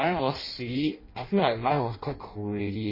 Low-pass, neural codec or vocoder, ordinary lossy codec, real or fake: 5.4 kHz; codec, 16 kHz in and 24 kHz out, 0.6 kbps, FireRedTTS-2 codec; MP3, 24 kbps; fake